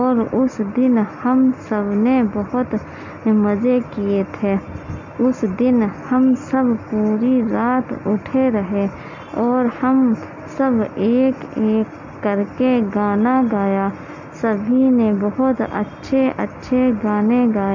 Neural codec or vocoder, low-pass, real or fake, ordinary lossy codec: none; 7.2 kHz; real; MP3, 48 kbps